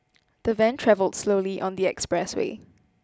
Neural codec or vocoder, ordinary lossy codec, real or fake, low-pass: none; none; real; none